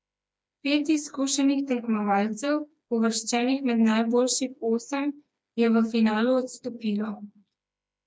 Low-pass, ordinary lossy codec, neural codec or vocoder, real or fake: none; none; codec, 16 kHz, 2 kbps, FreqCodec, smaller model; fake